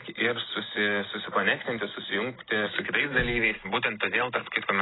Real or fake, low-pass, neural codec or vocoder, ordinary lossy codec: real; 7.2 kHz; none; AAC, 16 kbps